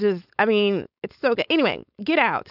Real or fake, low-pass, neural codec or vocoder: fake; 5.4 kHz; codec, 16 kHz, 4.8 kbps, FACodec